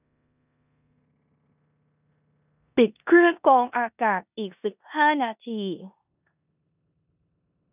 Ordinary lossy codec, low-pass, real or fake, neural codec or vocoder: none; 3.6 kHz; fake; codec, 16 kHz in and 24 kHz out, 0.9 kbps, LongCat-Audio-Codec, four codebook decoder